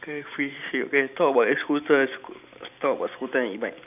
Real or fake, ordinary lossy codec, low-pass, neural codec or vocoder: fake; none; 3.6 kHz; vocoder, 44.1 kHz, 128 mel bands every 512 samples, BigVGAN v2